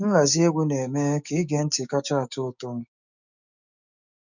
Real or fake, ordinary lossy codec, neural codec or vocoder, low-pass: fake; none; codec, 16 kHz, 6 kbps, DAC; 7.2 kHz